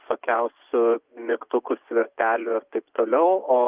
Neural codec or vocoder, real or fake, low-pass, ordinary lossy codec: vocoder, 24 kHz, 100 mel bands, Vocos; fake; 3.6 kHz; Opus, 24 kbps